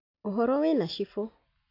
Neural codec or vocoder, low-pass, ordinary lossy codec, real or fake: none; 5.4 kHz; AAC, 32 kbps; real